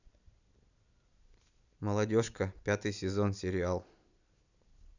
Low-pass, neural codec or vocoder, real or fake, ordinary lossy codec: 7.2 kHz; vocoder, 44.1 kHz, 128 mel bands every 256 samples, BigVGAN v2; fake; none